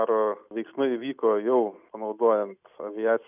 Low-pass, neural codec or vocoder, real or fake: 3.6 kHz; none; real